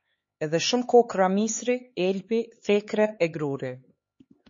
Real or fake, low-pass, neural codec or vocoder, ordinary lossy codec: fake; 7.2 kHz; codec, 16 kHz, 4 kbps, X-Codec, HuBERT features, trained on LibriSpeech; MP3, 32 kbps